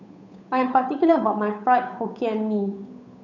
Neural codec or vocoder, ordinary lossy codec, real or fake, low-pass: codec, 16 kHz, 8 kbps, FunCodec, trained on Chinese and English, 25 frames a second; none; fake; 7.2 kHz